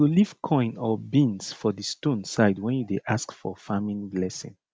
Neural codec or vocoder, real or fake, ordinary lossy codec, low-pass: none; real; none; none